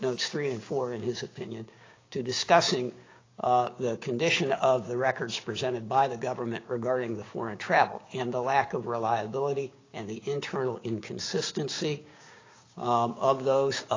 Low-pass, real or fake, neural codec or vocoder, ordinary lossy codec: 7.2 kHz; fake; codec, 16 kHz, 6 kbps, DAC; AAC, 32 kbps